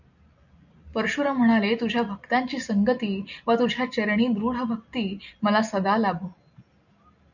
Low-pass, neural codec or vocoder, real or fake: 7.2 kHz; none; real